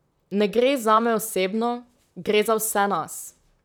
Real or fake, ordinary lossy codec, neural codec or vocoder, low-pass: fake; none; vocoder, 44.1 kHz, 128 mel bands, Pupu-Vocoder; none